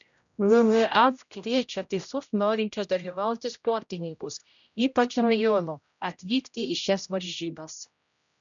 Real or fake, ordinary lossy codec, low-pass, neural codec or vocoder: fake; MP3, 96 kbps; 7.2 kHz; codec, 16 kHz, 0.5 kbps, X-Codec, HuBERT features, trained on general audio